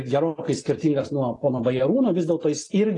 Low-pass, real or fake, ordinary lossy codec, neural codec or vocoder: 10.8 kHz; real; AAC, 32 kbps; none